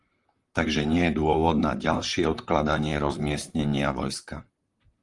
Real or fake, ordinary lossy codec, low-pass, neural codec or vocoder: fake; Opus, 32 kbps; 10.8 kHz; vocoder, 44.1 kHz, 128 mel bands, Pupu-Vocoder